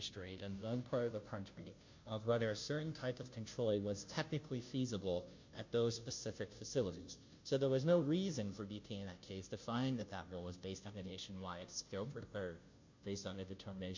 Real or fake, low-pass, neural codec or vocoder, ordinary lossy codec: fake; 7.2 kHz; codec, 16 kHz, 0.5 kbps, FunCodec, trained on Chinese and English, 25 frames a second; MP3, 48 kbps